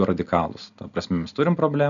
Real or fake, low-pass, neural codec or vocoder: real; 7.2 kHz; none